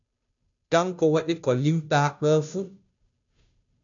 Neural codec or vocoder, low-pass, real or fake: codec, 16 kHz, 0.5 kbps, FunCodec, trained on Chinese and English, 25 frames a second; 7.2 kHz; fake